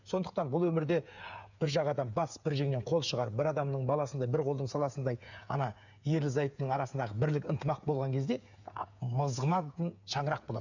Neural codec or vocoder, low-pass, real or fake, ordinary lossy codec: codec, 16 kHz, 8 kbps, FreqCodec, smaller model; 7.2 kHz; fake; none